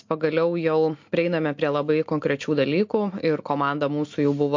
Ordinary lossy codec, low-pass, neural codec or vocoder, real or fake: MP3, 48 kbps; 7.2 kHz; none; real